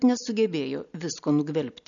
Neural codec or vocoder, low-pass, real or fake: none; 7.2 kHz; real